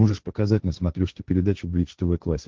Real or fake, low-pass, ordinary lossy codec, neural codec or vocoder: fake; 7.2 kHz; Opus, 16 kbps; codec, 16 kHz, 1.1 kbps, Voila-Tokenizer